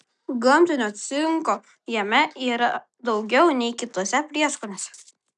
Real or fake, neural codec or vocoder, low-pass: real; none; 10.8 kHz